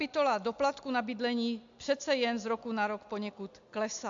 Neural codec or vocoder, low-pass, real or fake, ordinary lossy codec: none; 7.2 kHz; real; AAC, 64 kbps